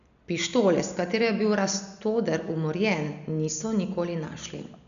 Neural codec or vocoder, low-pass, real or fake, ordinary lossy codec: none; 7.2 kHz; real; none